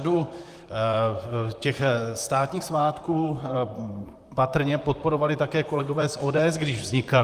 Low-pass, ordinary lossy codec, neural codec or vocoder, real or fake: 14.4 kHz; Opus, 32 kbps; vocoder, 44.1 kHz, 128 mel bands, Pupu-Vocoder; fake